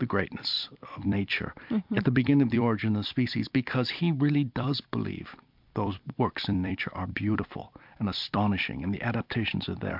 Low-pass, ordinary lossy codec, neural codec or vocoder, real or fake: 5.4 kHz; MP3, 48 kbps; vocoder, 44.1 kHz, 128 mel bands every 256 samples, BigVGAN v2; fake